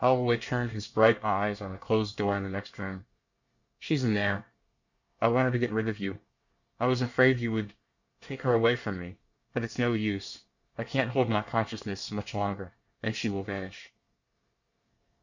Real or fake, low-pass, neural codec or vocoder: fake; 7.2 kHz; codec, 24 kHz, 1 kbps, SNAC